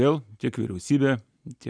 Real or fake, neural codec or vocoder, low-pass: real; none; 9.9 kHz